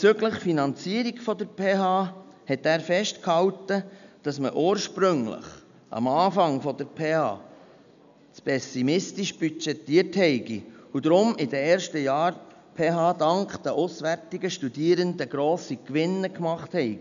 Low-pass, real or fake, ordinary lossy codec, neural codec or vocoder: 7.2 kHz; real; none; none